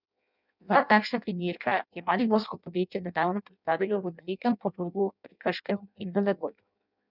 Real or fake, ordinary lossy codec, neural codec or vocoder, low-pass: fake; none; codec, 16 kHz in and 24 kHz out, 0.6 kbps, FireRedTTS-2 codec; 5.4 kHz